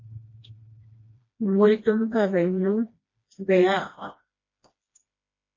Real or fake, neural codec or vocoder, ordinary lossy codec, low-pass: fake; codec, 16 kHz, 1 kbps, FreqCodec, smaller model; MP3, 32 kbps; 7.2 kHz